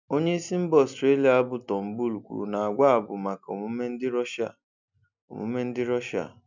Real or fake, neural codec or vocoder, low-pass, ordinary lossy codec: real; none; 7.2 kHz; none